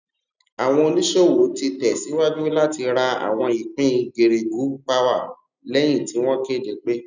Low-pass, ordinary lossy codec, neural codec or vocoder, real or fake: 7.2 kHz; none; none; real